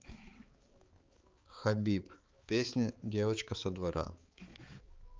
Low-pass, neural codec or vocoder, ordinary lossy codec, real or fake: 7.2 kHz; codec, 16 kHz, 4 kbps, X-Codec, HuBERT features, trained on balanced general audio; Opus, 32 kbps; fake